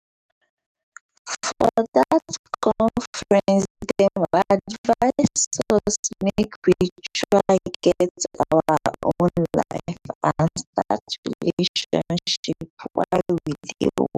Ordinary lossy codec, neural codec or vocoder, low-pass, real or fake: none; codec, 44.1 kHz, 7.8 kbps, DAC; 14.4 kHz; fake